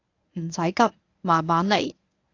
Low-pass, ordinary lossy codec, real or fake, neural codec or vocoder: 7.2 kHz; AAC, 48 kbps; fake; codec, 24 kHz, 0.9 kbps, WavTokenizer, medium speech release version 1